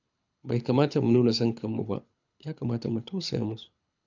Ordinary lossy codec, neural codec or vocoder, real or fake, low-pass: none; codec, 24 kHz, 6 kbps, HILCodec; fake; 7.2 kHz